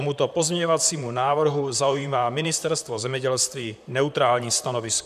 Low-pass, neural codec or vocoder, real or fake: 14.4 kHz; vocoder, 44.1 kHz, 128 mel bands, Pupu-Vocoder; fake